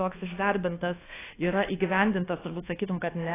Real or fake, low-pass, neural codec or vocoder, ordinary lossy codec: fake; 3.6 kHz; codec, 24 kHz, 1.2 kbps, DualCodec; AAC, 16 kbps